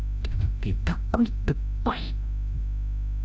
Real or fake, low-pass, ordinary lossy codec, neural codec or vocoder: fake; none; none; codec, 16 kHz, 0.5 kbps, FreqCodec, larger model